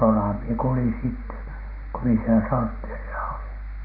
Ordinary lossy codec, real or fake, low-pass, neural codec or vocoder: none; real; 5.4 kHz; none